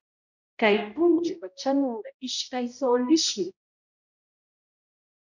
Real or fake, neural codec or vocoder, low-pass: fake; codec, 16 kHz, 0.5 kbps, X-Codec, HuBERT features, trained on balanced general audio; 7.2 kHz